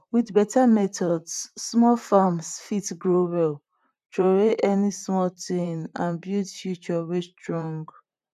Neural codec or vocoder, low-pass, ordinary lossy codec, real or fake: vocoder, 44.1 kHz, 128 mel bands, Pupu-Vocoder; 14.4 kHz; none; fake